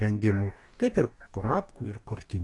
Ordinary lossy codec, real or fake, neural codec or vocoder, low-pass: AAC, 48 kbps; fake; codec, 44.1 kHz, 2.6 kbps, DAC; 10.8 kHz